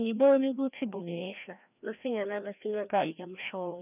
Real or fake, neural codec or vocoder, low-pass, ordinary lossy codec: fake; codec, 16 kHz, 1 kbps, FreqCodec, larger model; 3.6 kHz; none